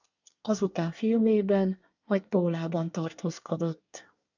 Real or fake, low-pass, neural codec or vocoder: fake; 7.2 kHz; codec, 24 kHz, 1 kbps, SNAC